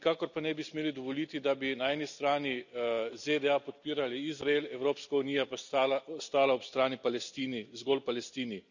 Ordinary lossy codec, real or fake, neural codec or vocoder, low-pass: none; real; none; 7.2 kHz